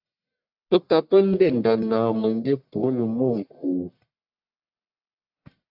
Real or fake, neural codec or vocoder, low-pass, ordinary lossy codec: fake; codec, 44.1 kHz, 1.7 kbps, Pupu-Codec; 5.4 kHz; AAC, 48 kbps